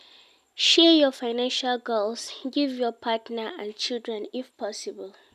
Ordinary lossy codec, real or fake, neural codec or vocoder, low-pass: none; real; none; 14.4 kHz